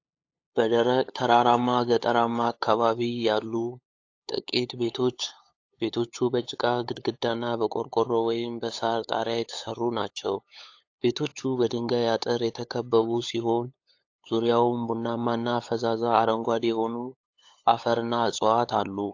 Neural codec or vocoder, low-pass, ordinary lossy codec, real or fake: codec, 16 kHz, 8 kbps, FunCodec, trained on LibriTTS, 25 frames a second; 7.2 kHz; AAC, 48 kbps; fake